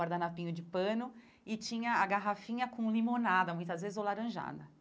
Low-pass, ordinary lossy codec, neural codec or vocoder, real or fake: none; none; none; real